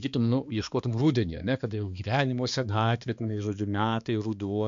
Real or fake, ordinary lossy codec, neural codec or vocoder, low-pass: fake; MP3, 64 kbps; codec, 16 kHz, 2 kbps, X-Codec, HuBERT features, trained on balanced general audio; 7.2 kHz